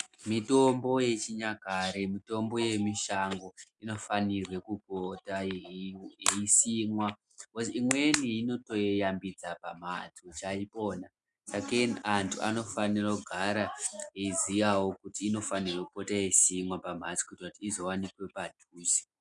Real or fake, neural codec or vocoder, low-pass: real; none; 10.8 kHz